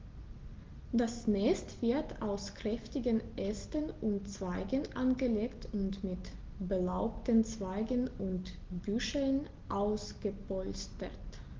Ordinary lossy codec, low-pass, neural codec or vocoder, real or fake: Opus, 16 kbps; 7.2 kHz; none; real